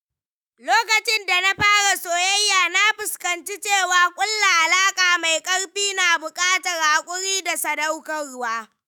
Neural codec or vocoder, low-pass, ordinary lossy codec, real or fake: autoencoder, 48 kHz, 128 numbers a frame, DAC-VAE, trained on Japanese speech; none; none; fake